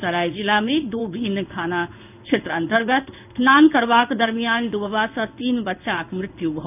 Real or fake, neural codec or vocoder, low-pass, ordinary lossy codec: fake; codec, 16 kHz in and 24 kHz out, 1 kbps, XY-Tokenizer; 3.6 kHz; none